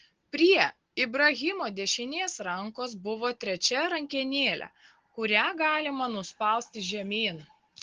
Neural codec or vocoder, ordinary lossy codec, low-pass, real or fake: none; Opus, 16 kbps; 7.2 kHz; real